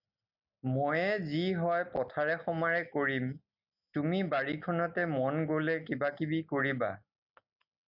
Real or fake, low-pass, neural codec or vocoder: real; 5.4 kHz; none